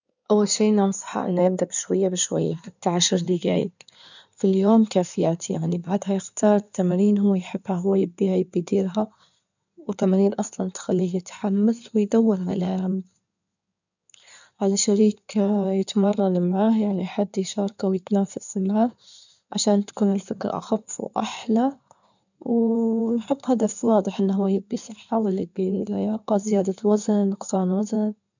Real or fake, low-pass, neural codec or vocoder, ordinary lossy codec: fake; 7.2 kHz; codec, 16 kHz in and 24 kHz out, 2.2 kbps, FireRedTTS-2 codec; none